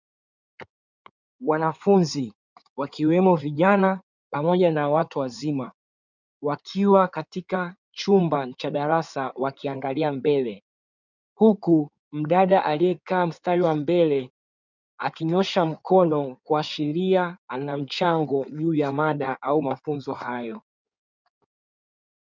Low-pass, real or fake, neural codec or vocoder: 7.2 kHz; fake; codec, 16 kHz in and 24 kHz out, 2.2 kbps, FireRedTTS-2 codec